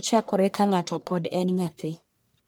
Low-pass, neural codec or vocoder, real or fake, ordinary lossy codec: none; codec, 44.1 kHz, 1.7 kbps, Pupu-Codec; fake; none